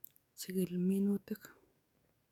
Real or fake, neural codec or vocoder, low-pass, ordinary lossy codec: fake; codec, 44.1 kHz, 7.8 kbps, DAC; 19.8 kHz; none